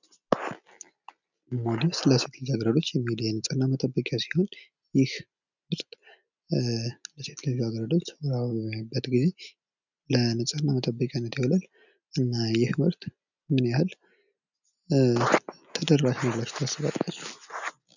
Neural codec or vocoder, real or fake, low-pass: none; real; 7.2 kHz